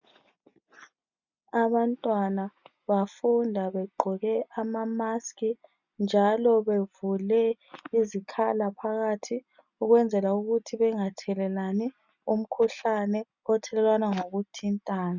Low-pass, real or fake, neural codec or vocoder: 7.2 kHz; real; none